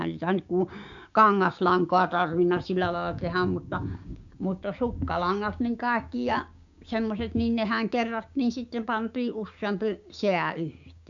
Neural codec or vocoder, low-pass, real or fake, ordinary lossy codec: codec, 16 kHz, 6 kbps, DAC; 7.2 kHz; fake; AAC, 64 kbps